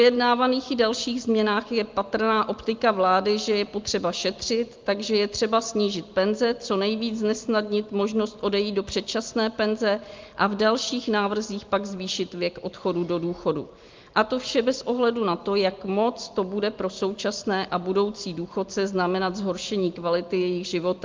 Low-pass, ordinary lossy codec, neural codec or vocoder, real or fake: 7.2 kHz; Opus, 32 kbps; none; real